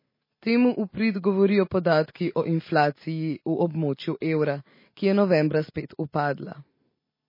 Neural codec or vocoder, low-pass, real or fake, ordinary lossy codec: none; 5.4 kHz; real; MP3, 24 kbps